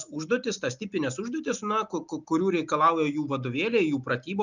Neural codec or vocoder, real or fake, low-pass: none; real; 7.2 kHz